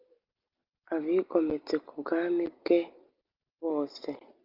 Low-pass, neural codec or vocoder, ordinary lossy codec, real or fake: 5.4 kHz; none; Opus, 24 kbps; real